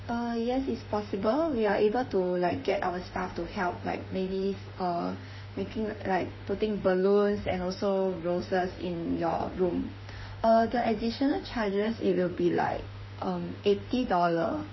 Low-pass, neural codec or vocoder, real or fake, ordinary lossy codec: 7.2 kHz; autoencoder, 48 kHz, 32 numbers a frame, DAC-VAE, trained on Japanese speech; fake; MP3, 24 kbps